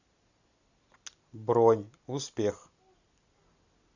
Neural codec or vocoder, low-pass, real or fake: none; 7.2 kHz; real